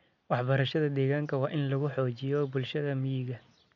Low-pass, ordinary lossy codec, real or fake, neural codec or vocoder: 7.2 kHz; none; real; none